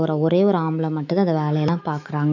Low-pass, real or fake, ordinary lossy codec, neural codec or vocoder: 7.2 kHz; fake; none; autoencoder, 48 kHz, 128 numbers a frame, DAC-VAE, trained on Japanese speech